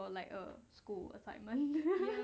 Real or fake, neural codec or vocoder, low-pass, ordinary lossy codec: real; none; none; none